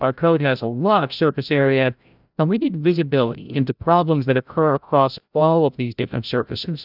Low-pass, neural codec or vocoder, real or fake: 5.4 kHz; codec, 16 kHz, 0.5 kbps, FreqCodec, larger model; fake